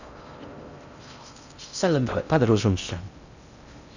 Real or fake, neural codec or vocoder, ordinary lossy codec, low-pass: fake; codec, 16 kHz in and 24 kHz out, 0.6 kbps, FocalCodec, streaming, 4096 codes; none; 7.2 kHz